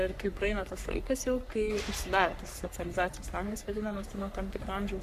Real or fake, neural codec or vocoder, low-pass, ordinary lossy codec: fake; codec, 44.1 kHz, 3.4 kbps, Pupu-Codec; 14.4 kHz; Opus, 64 kbps